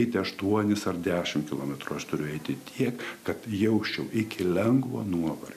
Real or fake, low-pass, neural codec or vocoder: real; 14.4 kHz; none